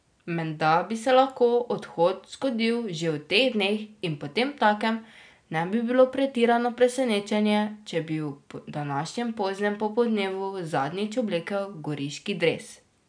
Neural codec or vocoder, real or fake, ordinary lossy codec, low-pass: none; real; none; 9.9 kHz